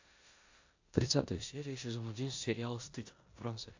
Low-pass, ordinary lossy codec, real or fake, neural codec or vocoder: 7.2 kHz; AAC, 48 kbps; fake; codec, 16 kHz in and 24 kHz out, 0.9 kbps, LongCat-Audio-Codec, four codebook decoder